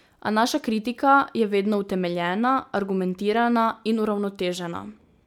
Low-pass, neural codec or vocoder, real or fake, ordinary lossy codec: 19.8 kHz; none; real; none